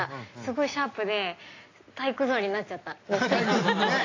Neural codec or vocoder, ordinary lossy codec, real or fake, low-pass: none; AAC, 32 kbps; real; 7.2 kHz